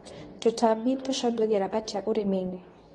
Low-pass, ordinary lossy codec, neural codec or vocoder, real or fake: 10.8 kHz; AAC, 32 kbps; codec, 24 kHz, 0.9 kbps, WavTokenizer, medium speech release version 2; fake